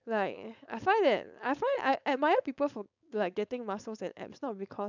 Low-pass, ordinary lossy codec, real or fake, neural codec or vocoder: 7.2 kHz; none; fake; codec, 16 kHz, 4.8 kbps, FACodec